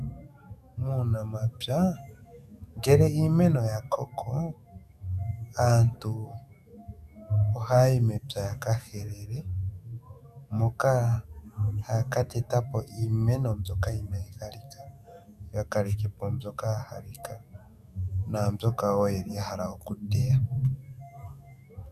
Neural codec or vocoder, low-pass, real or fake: autoencoder, 48 kHz, 128 numbers a frame, DAC-VAE, trained on Japanese speech; 14.4 kHz; fake